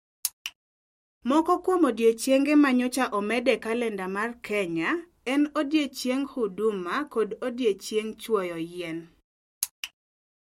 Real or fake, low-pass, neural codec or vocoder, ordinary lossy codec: real; 19.8 kHz; none; MP3, 64 kbps